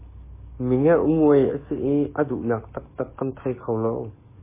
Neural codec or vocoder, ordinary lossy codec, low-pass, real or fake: codec, 44.1 kHz, 7.8 kbps, DAC; MP3, 16 kbps; 3.6 kHz; fake